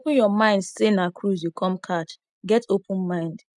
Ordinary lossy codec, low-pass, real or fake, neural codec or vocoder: none; 10.8 kHz; real; none